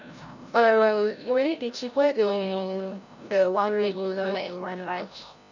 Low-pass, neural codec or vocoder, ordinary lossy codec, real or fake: 7.2 kHz; codec, 16 kHz, 0.5 kbps, FreqCodec, larger model; none; fake